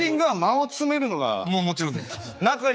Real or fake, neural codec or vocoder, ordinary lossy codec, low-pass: fake; codec, 16 kHz, 4 kbps, X-Codec, HuBERT features, trained on general audio; none; none